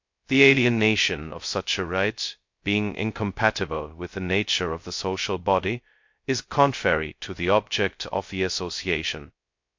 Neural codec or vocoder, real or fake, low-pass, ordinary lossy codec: codec, 16 kHz, 0.2 kbps, FocalCodec; fake; 7.2 kHz; MP3, 48 kbps